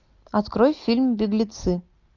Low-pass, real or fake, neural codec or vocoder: 7.2 kHz; real; none